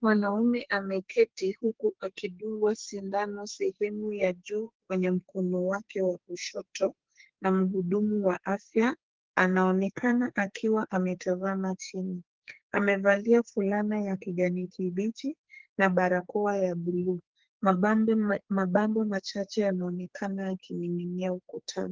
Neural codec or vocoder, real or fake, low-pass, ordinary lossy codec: codec, 44.1 kHz, 2.6 kbps, SNAC; fake; 7.2 kHz; Opus, 16 kbps